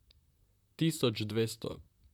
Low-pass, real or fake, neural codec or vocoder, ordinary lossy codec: 19.8 kHz; fake; vocoder, 44.1 kHz, 128 mel bands, Pupu-Vocoder; none